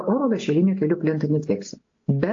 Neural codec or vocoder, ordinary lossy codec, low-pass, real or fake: none; AAC, 48 kbps; 7.2 kHz; real